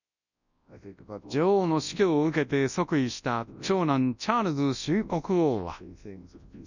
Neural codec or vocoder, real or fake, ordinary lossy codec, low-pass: codec, 24 kHz, 0.9 kbps, WavTokenizer, large speech release; fake; none; 7.2 kHz